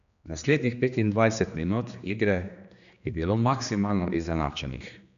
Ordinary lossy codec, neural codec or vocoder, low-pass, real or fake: none; codec, 16 kHz, 2 kbps, X-Codec, HuBERT features, trained on general audio; 7.2 kHz; fake